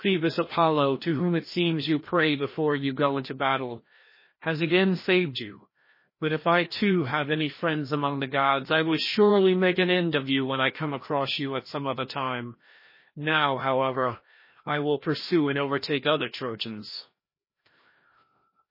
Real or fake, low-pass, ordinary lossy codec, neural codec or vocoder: fake; 5.4 kHz; MP3, 24 kbps; codec, 16 kHz, 1 kbps, FreqCodec, larger model